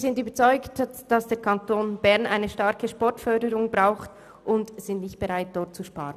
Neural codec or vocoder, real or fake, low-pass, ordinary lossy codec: none; real; 14.4 kHz; none